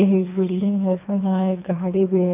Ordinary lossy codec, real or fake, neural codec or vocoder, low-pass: none; fake; codec, 24 kHz, 3 kbps, HILCodec; 3.6 kHz